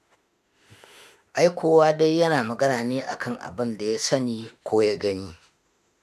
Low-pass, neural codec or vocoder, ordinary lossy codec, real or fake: 14.4 kHz; autoencoder, 48 kHz, 32 numbers a frame, DAC-VAE, trained on Japanese speech; AAC, 96 kbps; fake